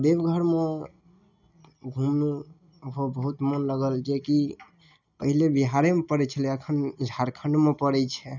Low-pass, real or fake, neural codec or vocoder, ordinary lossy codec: 7.2 kHz; real; none; none